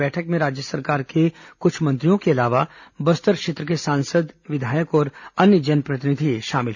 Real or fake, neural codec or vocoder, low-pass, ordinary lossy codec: real; none; 7.2 kHz; none